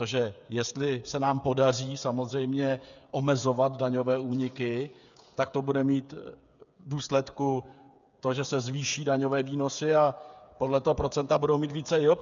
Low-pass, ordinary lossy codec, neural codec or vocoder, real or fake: 7.2 kHz; MP3, 96 kbps; codec, 16 kHz, 8 kbps, FreqCodec, smaller model; fake